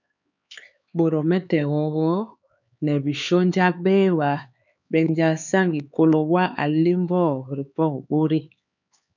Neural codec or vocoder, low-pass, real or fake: codec, 16 kHz, 4 kbps, X-Codec, HuBERT features, trained on LibriSpeech; 7.2 kHz; fake